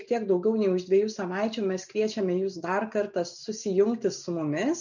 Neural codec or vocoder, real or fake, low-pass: none; real; 7.2 kHz